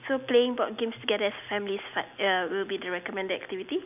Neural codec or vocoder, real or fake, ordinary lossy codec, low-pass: none; real; none; 3.6 kHz